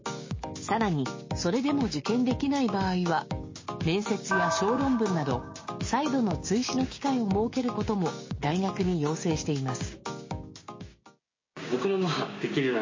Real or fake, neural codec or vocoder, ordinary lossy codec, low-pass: fake; codec, 16 kHz, 6 kbps, DAC; MP3, 32 kbps; 7.2 kHz